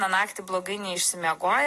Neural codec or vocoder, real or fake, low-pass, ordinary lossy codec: none; real; 14.4 kHz; AAC, 48 kbps